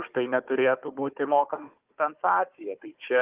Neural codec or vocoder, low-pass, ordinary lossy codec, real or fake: codec, 16 kHz, 4 kbps, FunCodec, trained on Chinese and English, 50 frames a second; 3.6 kHz; Opus, 24 kbps; fake